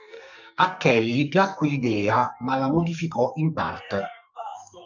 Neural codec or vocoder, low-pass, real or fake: codec, 44.1 kHz, 2.6 kbps, SNAC; 7.2 kHz; fake